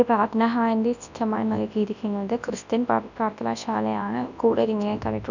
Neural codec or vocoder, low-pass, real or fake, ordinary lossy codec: codec, 24 kHz, 0.9 kbps, WavTokenizer, large speech release; 7.2 kHz; fake; none